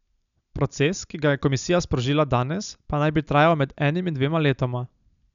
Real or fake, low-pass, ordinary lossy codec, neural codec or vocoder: real; 7.2 kHz; none; none